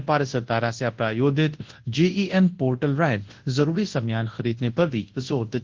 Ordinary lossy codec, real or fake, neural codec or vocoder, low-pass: Opus, 16 kbps; fake; codec, 24 kHz, 0.9 kbps, WavTokenizer, large speech release; 7.2 kHz